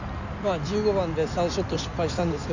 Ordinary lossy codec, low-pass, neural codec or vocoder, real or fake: none; 7.2 kHz; codec, 16 kHz in and 24 kHz out, 2.2 kbps, FireRedTTS-2 codec; fake